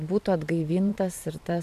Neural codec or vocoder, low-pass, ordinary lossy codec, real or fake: vocoder, 44.1 kHz, 128 mel bands every 512 samples, BigVGAN v2; 14.4 kHz; AAC, 96 kbps; fake